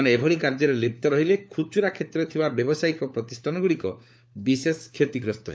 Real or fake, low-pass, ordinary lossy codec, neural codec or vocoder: fake; none; none; codec, 16 kHz, 4 kbps, FunCodec, trained on LibriTTS, 50 frames a second